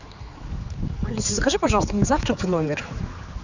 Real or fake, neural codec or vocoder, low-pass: fake; codec, 16 kHz, 4 kbps, X-Codec, HuBERT features, trained on general audio; 7.2 kHz